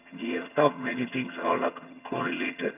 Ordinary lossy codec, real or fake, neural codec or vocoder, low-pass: none; fake; vocoder, 22.05 kHz, 80 mel bands, HiFi-GAN; 3.6 kHz